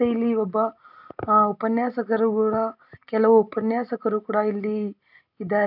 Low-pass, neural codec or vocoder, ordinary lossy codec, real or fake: 5.4 kHz; none; none; real